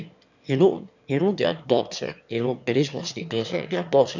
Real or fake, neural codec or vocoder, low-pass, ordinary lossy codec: fake; autoencoder, 22.05 kHz, a latent of 192 numbers a frame, VITS, trained on one speaker; 7.2 kHz; none